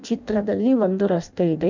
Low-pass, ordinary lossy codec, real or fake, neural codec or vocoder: 7.2 kHz; none; fake; codec, 16 kHz in and 24 kHz out, 0.6 kbps, FireRedTTS-2 codec